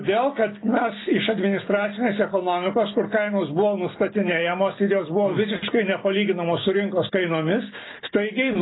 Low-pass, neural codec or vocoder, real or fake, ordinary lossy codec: 7.2 kHz; none; real; AAC, 16 kbps